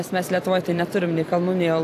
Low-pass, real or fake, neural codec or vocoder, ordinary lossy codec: 14.4 kHz; fake; vocoder, 44.1 kHz, 128 mel bands every 256 samples, BigVGAN v2; AAC, 64 kbps